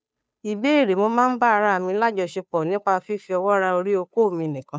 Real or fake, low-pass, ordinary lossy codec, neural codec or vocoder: fake; none; none; codec, 16 kHz, 2 kbps, FunCodec, trained on Chinese and English, 25 frames a second